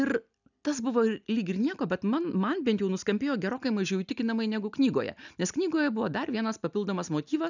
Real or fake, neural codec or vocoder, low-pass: real; none; 7.2 kHz